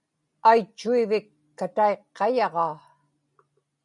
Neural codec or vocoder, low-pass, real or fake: none; 10.8 kHz; real